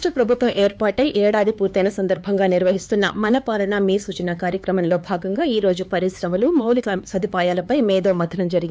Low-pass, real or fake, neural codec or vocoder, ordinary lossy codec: none; fake; codec, 16 kHz, 4 kbps, X-Codec, HuBERT features, trained on LibriSpeech; none